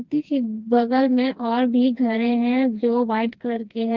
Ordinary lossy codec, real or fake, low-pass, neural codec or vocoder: Opus, 32 kbps; fake; 7.2 kHz; codec, 16 kHz, 2 kbps, FreqCodec, smaller model